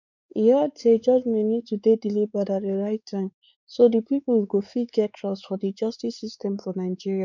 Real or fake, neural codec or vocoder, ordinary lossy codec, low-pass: fake; codec, 16 kHz, 4 kbps, X-Codec, WavLM features, trained on Multilingual LibriSpeech; none; 7.2 kHz